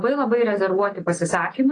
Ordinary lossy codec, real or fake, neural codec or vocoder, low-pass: AAC, 32 kbps; real; none; 9.9 kHz